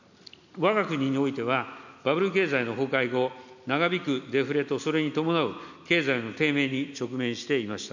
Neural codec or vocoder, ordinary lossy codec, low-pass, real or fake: none; none; 7.2 kHz; real